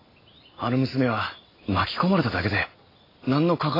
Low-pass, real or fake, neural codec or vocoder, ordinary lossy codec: 5.4 kHz; real; none; AAC, 24 kbps